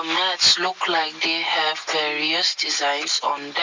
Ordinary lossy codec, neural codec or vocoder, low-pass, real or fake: MP3, 64 kbps; none; 7.2 kHz; real